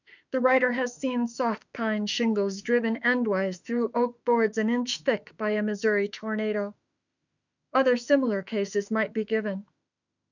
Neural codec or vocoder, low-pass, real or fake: autoencoder, 48 kHz, 32 numbers a frame, DAC-VAE, trained on Japanese speech; 7.2 kHz; fake